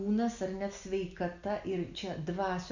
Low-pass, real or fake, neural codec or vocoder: 7.2 kHz; real; none